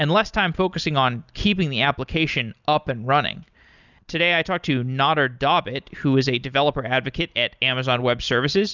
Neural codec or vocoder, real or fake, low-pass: none; real; 7.2 kHz